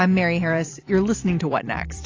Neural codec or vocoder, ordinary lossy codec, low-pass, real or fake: vocoder, 44.1 kHz, 128 mel bands every 256 samples, BigVGAN v2; AAC, 32 kbps; 7.2 kHz; fake